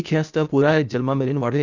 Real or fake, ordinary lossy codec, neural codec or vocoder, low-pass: fake; none; codec, 16 kHz, 0.8 kbps, ZipCodec; 7.2 kHz